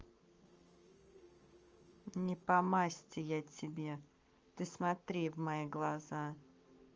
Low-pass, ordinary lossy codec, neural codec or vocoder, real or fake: 7.2 kHz; Opus, 24 kbps; codec, 44.1 kHz, 7.8 kbps, Pupu-Codec; fake